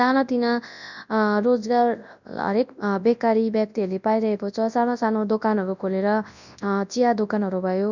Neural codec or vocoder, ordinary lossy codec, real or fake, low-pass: codec, 24 kHz, 0.9 kbps, WavTokenizer, large speech release; none; fake; 7.2 kHz